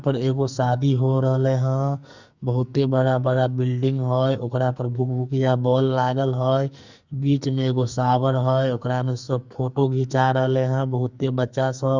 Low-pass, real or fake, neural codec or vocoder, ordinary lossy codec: 7.2 kHz; fake; codec, 44.1 kHz, 2.6 kbps, SNAC; Opus, 64 kbps